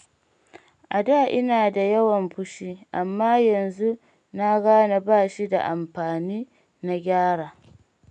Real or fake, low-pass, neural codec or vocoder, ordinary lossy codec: real; 9.9 kHz; none; none